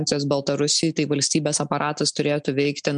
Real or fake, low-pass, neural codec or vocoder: real; 9.9 kHz; none